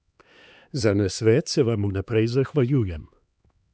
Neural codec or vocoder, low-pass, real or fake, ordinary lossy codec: codec, 16 kHz, 2 kbps, X-Codec, HuBERT features, trained on LibriSpeech; none; fake; none